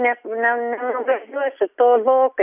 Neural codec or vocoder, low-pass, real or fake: none; 3.6 kHz; real